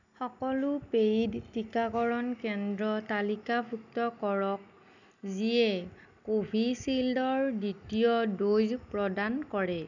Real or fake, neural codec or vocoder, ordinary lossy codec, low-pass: real; none; none; 7.2 kHz